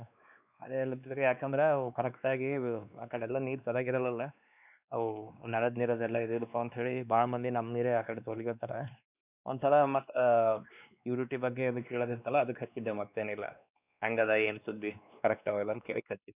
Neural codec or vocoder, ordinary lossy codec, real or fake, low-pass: codec, 16 kHz, 2 kbps, X-Codec, WavLM features, trained on Multilingual LibriSpeech; none; fake; 3.6 kHz